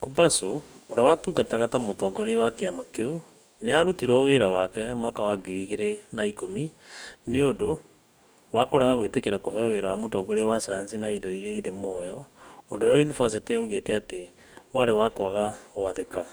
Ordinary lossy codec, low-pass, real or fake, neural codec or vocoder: none; none; fake; codec, 44.1 kHz, 2.6 kbps, DAC